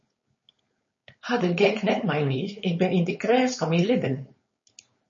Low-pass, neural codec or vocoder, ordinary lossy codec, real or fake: 7.2 kHz; codec, 16 kHz, 4.8 kbps, FACodec; MP3, 32 kbps; fake